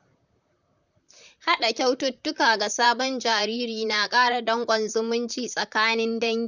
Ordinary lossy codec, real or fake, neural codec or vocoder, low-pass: none; fake; vocoder, 44.1 kHz, 128 mel bands, Pupu-Vocoder; 7.2 kHz